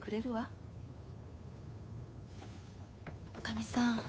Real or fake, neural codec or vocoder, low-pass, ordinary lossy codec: fake; codec, 16 kHz, 2 kbps, FunCodec, trained on Chinese and English, 25 frames a second; none; none